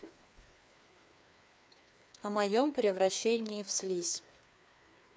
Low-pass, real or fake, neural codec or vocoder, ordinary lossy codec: none; fake; codec, 16 kHz, 2 kbps, FreqCodec, larger model; none